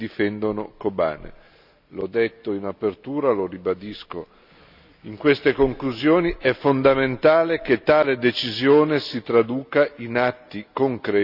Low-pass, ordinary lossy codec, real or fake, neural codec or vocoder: 5.4 kHz; none; real; none